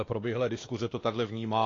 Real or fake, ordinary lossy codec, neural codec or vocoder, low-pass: fake; AAC, 32 kbps; codec, 16 kHz, 2 kbps, X-Codec, WavLM features, trained on Multilingual LibriSpeech; 7.2 kHz